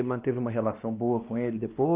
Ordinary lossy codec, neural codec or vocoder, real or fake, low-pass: Opus, 32 kbps; codec, 16 kHz, 2 kbps, X-Codec, WavLM features, trained on Multilingual LibriSpeech; fake; 3.6 kHz